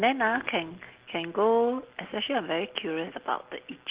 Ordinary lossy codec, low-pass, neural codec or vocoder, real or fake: Opus, 16 kbps; 3.6 kHz; none; real